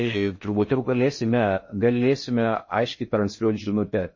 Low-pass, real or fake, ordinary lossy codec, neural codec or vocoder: 7.2 kHz; fake; MP3, 32 kbps; codec, 16 kHz in and 24 kHz out, 0.6 kbps, FocalCodec, streaming, 2048 codes